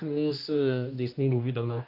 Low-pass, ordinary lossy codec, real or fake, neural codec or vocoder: 5.4 kHz; AAC, 48 kbps; fake; codec, 16 kHz, 1 kbps, X-Codec, HuBERT features, trained on general audio